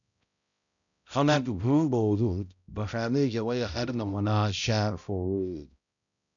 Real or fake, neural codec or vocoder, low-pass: fake; codec, 16 kHz, 0.5 kbps, X-Codec, HuBERT features, trained on balanced general audio; 7.2 kHz